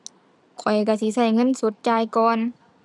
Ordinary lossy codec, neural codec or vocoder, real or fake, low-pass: none; none; real; none